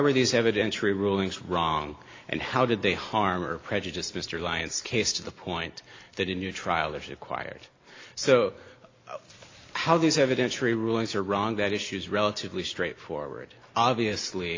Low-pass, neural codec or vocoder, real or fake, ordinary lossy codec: 7.2 kHz; none; real; AAC, 32 kbps